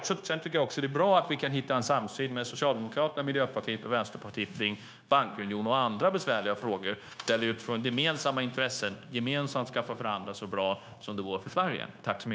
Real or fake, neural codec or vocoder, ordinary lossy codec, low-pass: fake; codec, 16 kHz, 0.9 kbps, LongCat-Audio-Codec; none; none